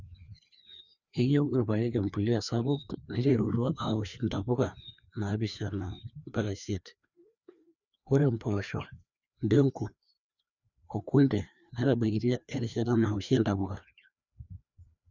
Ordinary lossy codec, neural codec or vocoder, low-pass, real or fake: none; codec, 16 kHz, 2 kbps, FreqCodec, larger model; 7.2 kHz; fake